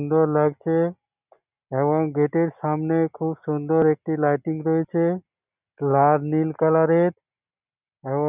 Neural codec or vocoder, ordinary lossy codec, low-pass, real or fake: none; none; 3.6 kHz; real